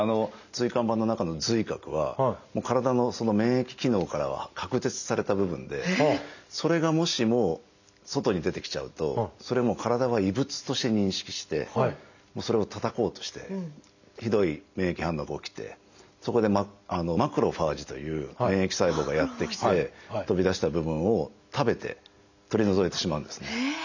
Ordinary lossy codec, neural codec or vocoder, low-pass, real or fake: none; none; 7.2 kHz; real